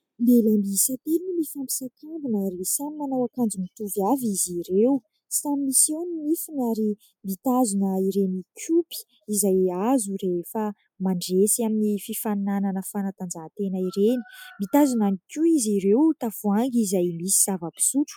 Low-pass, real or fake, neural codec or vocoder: 19.8 kHz; real; none